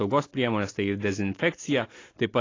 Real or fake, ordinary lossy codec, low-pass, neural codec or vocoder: real; AAC, 32 kbps; 7.2 kHz; none